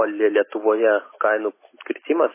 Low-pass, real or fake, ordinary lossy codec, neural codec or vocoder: 3.6 kHz; real; MP3, 16 kbps; none